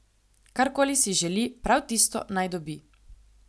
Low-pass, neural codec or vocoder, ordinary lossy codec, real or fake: none; none; none; real